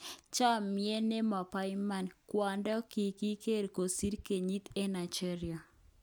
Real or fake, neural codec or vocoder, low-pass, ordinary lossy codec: real; none; none; none